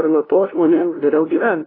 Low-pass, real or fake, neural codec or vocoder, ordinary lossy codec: 5.4 kHz; fake; codec, 16 kHz, 0.5 kbps, FunCodec, trained on LibriTTS, 25 frames a second; AAC, 24 kbps